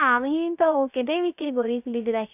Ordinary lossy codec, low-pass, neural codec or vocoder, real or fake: none; 3.6 kHz; codec, 16 kHz, 0.3 kbps, FocalCodec; fake